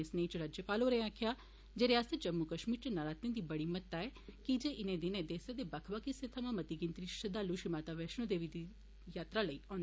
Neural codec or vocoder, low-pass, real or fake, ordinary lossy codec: none; none; real; none